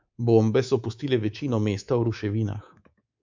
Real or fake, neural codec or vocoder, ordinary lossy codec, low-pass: fake; codec, 24 kHz, 3.1 kbps, DualCodec; MP3, 64 kbps; 7.2 kHz